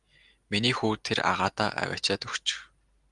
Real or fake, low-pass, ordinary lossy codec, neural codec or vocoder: real; 10.8 kHz; Opus, 32 kbps; none